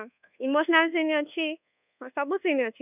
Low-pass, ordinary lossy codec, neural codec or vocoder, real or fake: 3.6 kHz; none; codec, 24 kHz, 1.2 kbps, DualCodec; fake